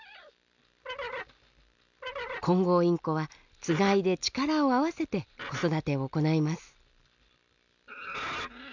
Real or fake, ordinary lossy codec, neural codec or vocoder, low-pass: real; none; none; 7.2 kHz